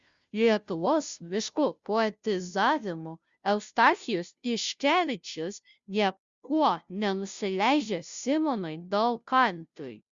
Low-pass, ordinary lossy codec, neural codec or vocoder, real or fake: 7.2 kHz; Opus, 64 kbps; codec, 16 kHz, 0.5 kbps, FunCodec, trained on Chinese and English, 25 frames a second; fake